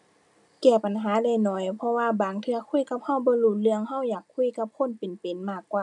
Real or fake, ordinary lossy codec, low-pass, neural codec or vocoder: real; none; 10.8 kHz; none